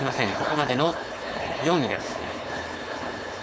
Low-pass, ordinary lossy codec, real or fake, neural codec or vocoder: none; none; fake; codec, 16 kHz, 4.8 kbps, FACodec